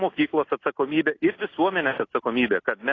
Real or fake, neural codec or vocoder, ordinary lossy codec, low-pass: real; none; AAC, 32 kbps; 7.2 kHz